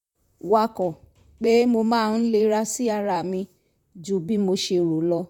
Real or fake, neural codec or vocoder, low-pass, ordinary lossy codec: fake; vocoder, 44.1 kHz, 128 mel bands, Pupu-Vocoder; 19.8 kHz; none